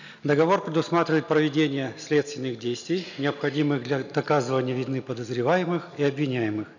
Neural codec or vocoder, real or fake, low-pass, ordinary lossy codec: none; real; 7.2 kHz; none